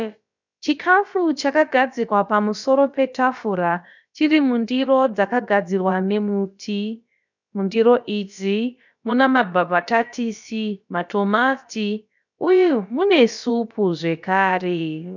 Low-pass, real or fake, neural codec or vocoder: 7.2 kHz; fake; codec, 16 kHz, about 1 kbps, DyCAST, with the encoder's durations